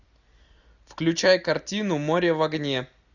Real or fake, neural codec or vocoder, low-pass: real; none; 7.2 kHz